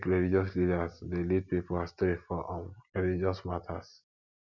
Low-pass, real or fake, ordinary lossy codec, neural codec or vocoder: 7.2 kHz; real; none; none